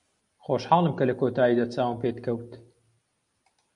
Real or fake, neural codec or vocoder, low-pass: real; none; 10.8 kHz